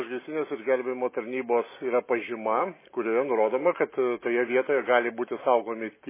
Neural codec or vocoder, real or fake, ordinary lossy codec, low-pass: none; real; MP3, 16 kbps; 3.6 kHz